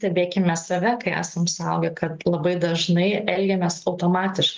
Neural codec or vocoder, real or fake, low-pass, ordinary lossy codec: none; real; 7.2 kHz; Opus, 32 kbps